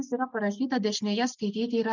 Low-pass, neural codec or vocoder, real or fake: 7.2 kHz; none; real